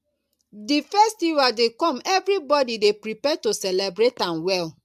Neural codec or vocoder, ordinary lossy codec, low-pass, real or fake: none; AAC, 96 kbps; 14.4 kHz; real